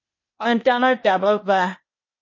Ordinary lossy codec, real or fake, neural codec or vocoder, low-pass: MP3, 32 kbps; fake; codec, 16 kHz, 0.8 kbps, ZipCodec; 7.2 kHz